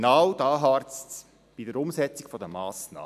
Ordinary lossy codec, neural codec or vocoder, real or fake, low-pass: MP3, 96 kbps; none; real; 14.4 kHz